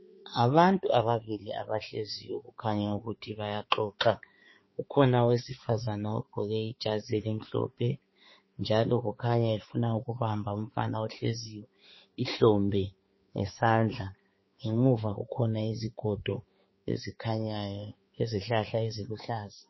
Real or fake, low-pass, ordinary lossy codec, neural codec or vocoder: fake; 7.2 kHz; MP3, 24 kbps; codec, 16 kHz, 4 kbps, X-Codec, HuBERT features, trained on balanced general audio